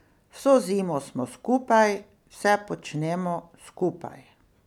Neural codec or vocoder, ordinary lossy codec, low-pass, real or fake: none; none; 19.8 kHz; real